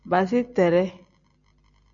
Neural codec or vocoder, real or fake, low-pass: none; real; 7.2 kHz